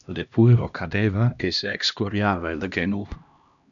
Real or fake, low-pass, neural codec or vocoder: fake; 7.2 kHz; codec, 16 kHz, 1 kbps, X-Codec, HuBERT features, trained on LibriSpeech